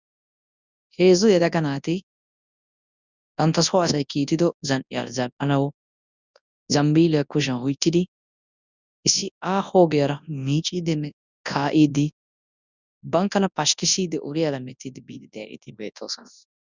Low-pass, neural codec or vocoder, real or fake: 7.2 kHz; codec, 24 kHz, 0.9 kbps, WavTokenizer, large speech release; fake